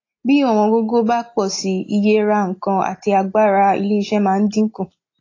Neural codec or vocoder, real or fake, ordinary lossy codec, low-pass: none; real; AAC, 32 kbps; 7.2 kHz